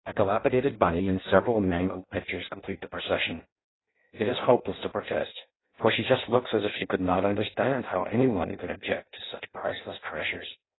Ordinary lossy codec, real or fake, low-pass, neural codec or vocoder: AAC, 16 kbps; fake; 7.2 kHz; codec, 16 kHz in and 24 kHz out, 0.6 kbps, FireRedTTS-2 codec